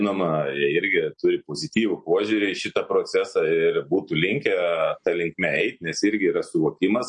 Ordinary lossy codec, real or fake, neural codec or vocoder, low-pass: MP3, 48 kbps; real; none; 9.9 kHz